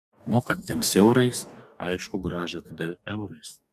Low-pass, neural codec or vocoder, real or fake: 14.4 kHz; codec, 44.1 kHz, 2.6 kbps, DAC; fake